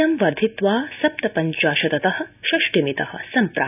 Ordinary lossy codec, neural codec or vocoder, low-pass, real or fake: none; none; 3.6 kHz; real